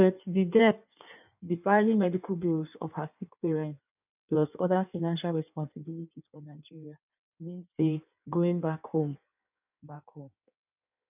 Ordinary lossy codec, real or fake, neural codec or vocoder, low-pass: none; fake; codec, 16 kHz in and 24 kHz out, 1.1 kbps, FireRedTTS-2 codec; 3.6 kHz